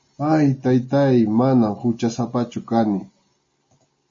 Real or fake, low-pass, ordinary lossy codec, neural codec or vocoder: real; 7.2 kHz; MP3, 32 kbps; none